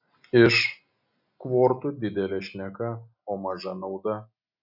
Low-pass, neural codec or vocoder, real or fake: 5.4 kHz; none; real